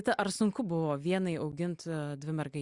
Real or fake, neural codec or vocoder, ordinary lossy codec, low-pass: real; none; Opus, 64 kbps; 10.8 kHz